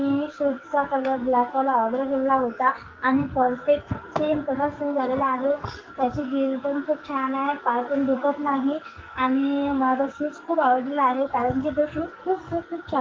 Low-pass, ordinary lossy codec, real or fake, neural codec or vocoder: 7.2 kHz; Opus, 24 kbps; fake; codec, 44.1 kHz, 2.6 kbps, SNAC